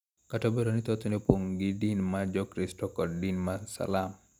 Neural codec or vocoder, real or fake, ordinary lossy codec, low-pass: none; real; none; 19.8 kHz